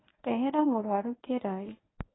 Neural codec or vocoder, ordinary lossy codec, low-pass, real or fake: codec, 24 kHz, 0.9 kbps, WavTokenizer, medium speech release version 1; AAC, 16 kbps; 7.2 kHz; fake